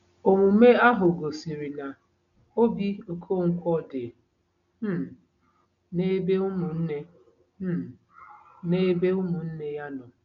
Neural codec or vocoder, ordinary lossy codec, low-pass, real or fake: none; none; 7.2 kHz; real